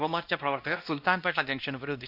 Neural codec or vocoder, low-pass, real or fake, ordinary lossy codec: codec, 16 kHz, 1 kbps, X-Codec, WavLM features, trained on Multilingual LibriSpeech; 5.4 kHz; fake; none